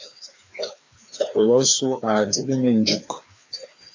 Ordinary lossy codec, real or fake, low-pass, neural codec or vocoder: AAC, 48 kbps; fake; 7.2 kHz; codec, 16 kHz, 2 kbps, FreqCodec, larger model